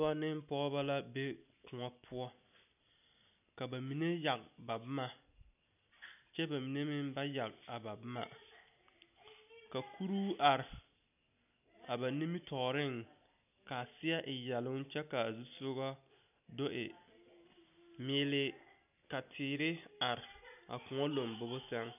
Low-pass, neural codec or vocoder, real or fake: 3.6 kHz; none; real